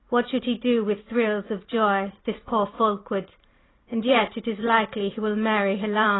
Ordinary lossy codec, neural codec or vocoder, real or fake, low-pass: AAC, 16 kbps; vocoder, 44.1 kHz, 128 mel bands every 512 samples, BigVGAN v2; fake; 7.2 kHz